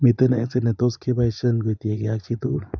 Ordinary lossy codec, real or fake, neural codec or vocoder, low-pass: none; fake; vocoder, 24 kHz, 100 mel bands, Vocos; 7.2 kHz